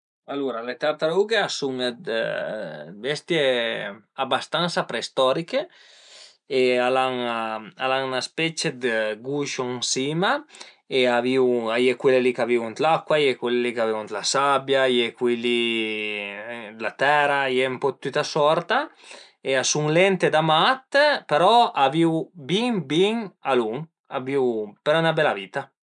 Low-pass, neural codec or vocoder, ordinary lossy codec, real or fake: 9.9 kHz; none; none; real